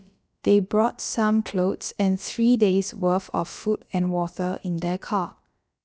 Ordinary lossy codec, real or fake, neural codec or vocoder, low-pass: none; fake; codec, 16 kHz, about 1 kbps, DyCAST, with the encoder's durations; none